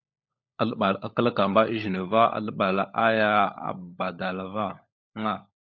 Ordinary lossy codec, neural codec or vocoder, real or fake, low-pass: MP3, 48 kbps; codec, 16 kHz, 16 kbps, FunCodec, trained on LibriTTS, 50 frames a second; fake; 7.2 kHz